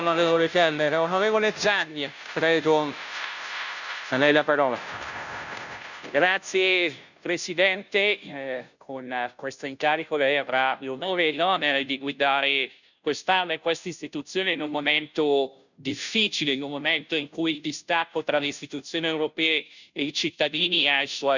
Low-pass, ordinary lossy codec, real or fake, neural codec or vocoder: 7.2 kHz; none; fake; codec, 16 kHz, 0.5 kbps, FunCodec, trained on Chinese and English, 25 frames a second